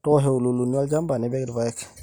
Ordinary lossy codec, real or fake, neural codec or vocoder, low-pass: none; real; none; none